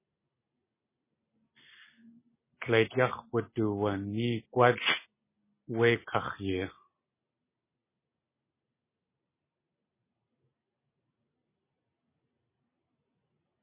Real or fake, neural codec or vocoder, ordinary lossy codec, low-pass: real; none; MP3, 16 kbps; 3.6 kHz